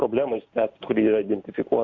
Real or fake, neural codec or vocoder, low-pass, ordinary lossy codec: real; none; 7.2 kHz; Opus, 64 kbps